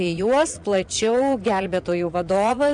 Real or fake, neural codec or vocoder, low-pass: fake; vocoder, 22.05 kHz, 80 mel bands, Vocos; 9.9 kHz